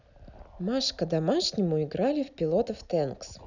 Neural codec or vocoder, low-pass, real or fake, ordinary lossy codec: none; 7.2 kHz; real; none